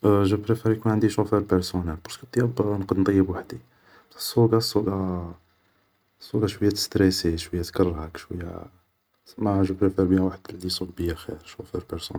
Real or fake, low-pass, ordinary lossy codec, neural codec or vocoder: real; none; none; none